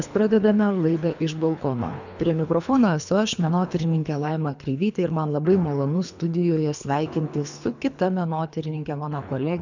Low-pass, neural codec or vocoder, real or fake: 7.2 kHz; codec, 24 kHz, 3 kbps, HILCodec; fake